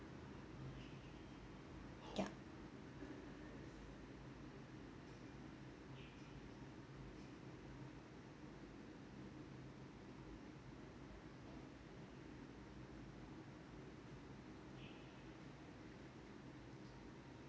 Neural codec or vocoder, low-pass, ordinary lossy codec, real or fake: none; none; none; real